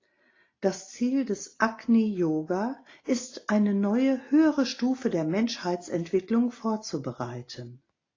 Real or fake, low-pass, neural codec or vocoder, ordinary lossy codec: real; 7.2 kHz; none; AAC, 32 kbps